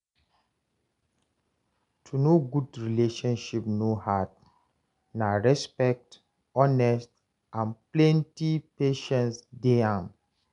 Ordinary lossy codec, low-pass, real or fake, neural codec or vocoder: none; 10.8 kHz; real; none